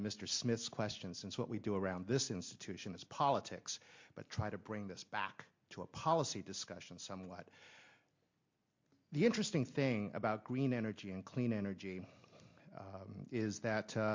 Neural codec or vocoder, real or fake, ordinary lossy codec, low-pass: none; real; MP3, 48 kbps; 7.2 kHz